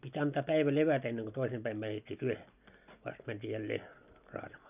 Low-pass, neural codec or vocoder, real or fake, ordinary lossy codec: 3.6 kHz; none; real; none